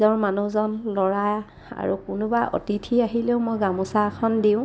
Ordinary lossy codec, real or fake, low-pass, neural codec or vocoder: none; real; none; none